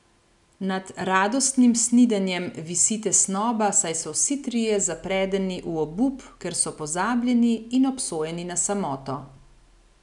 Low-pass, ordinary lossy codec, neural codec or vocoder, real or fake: 10.8 kHz; none; none; real